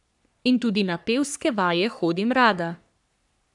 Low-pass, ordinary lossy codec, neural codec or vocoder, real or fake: 10.8 kHz; none; codec, 44.1 kHz, 3.4 kbps, Pupu-Codec; fake